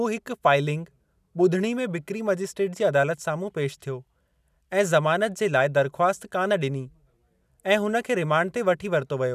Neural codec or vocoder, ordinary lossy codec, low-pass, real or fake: none; none; 14.4 kHz; real